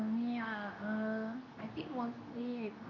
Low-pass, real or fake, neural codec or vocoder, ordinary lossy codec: 7.2 kHz; fake; codec, 24 kHz, 0.9 kbps, WavTokenizer, medium speech release version 2; none